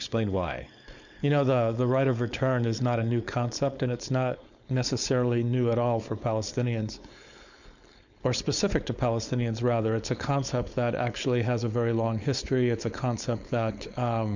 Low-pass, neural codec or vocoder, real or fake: 7.2 kHz; codec, 16 kHz, 4.8 kbps, FACodec; fake